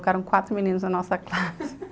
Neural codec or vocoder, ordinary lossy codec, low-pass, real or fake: none; none; none; real